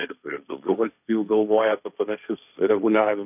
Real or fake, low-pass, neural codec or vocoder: fake; 3.6 kHz; codec, 16 kHz, 1.1 kbps, Voila-Tokenizer